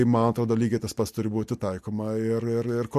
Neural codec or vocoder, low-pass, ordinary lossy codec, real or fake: none; 14.4 kHz; MP3, 64 kbps; real